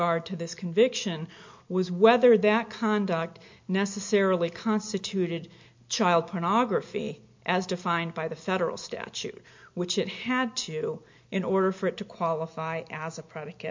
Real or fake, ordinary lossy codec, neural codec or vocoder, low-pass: real; MP3, 48 kbps; none; 7.2 kHz